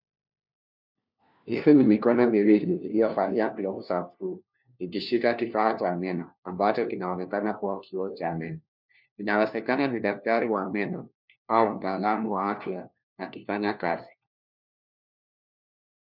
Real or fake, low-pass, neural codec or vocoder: fake; 5.4 kHz; codec, 16 kHz, 1 kbps, FunCodec, trained on LibriTTS, 50 frames a second